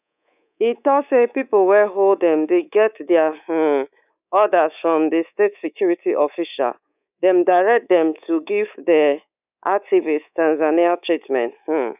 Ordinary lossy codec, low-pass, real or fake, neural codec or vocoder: none; 3.6 kHz; fake; codec, 24 kHz, 3.1 kbps, DualCodec